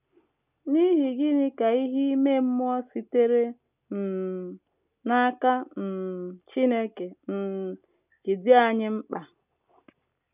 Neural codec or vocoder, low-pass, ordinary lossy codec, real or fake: none; 3.6 kHz; none; real